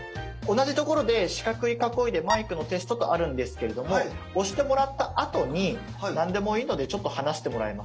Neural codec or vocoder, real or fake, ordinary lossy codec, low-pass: none; real; none; none